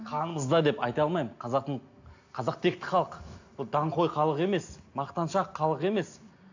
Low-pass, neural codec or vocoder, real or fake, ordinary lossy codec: 7.2 kHz; none; real; AAC, 48 kbps